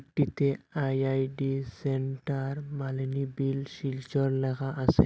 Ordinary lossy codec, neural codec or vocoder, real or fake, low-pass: none; none; real; none